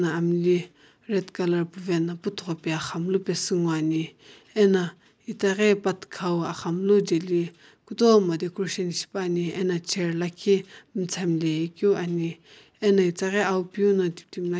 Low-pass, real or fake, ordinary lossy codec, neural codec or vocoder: none; real; none; none